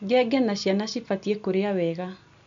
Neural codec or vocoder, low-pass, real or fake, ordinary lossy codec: none; 7.2 kHz; real; MP3, 64 kbps